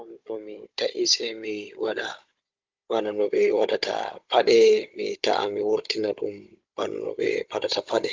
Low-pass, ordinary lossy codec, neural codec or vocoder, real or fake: 7.2 kHz; Opus, 24 kbps; codec, 16 kHz, 16 kbps, FunCodec, trained on Chinese and English, 50 frames a second; fake